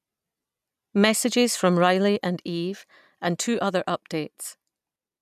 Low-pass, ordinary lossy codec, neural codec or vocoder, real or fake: 14.4 kHz; none; none; real